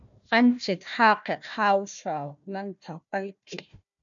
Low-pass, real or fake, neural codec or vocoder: 7.2 kHz; fake; codec, 16 kHz, 1 kbps, FunCodec, trained on Chinese and English, 50 frames a second